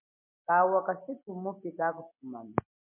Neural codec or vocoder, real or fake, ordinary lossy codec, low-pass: vocoder, 44.1 kHz, 128 mel bands every 256 samples, BigVGAN v2; fake; MP3, 24 kbps; 3.6 kHz